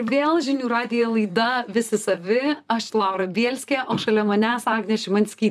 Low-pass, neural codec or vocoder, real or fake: 14.4 kHz; vocoder, 44.1 kHz, 128 mel bands, Pupu-Vocoder; fake